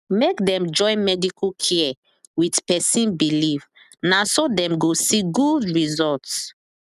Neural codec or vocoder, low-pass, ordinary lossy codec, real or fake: none; 14.4 kHz; none; real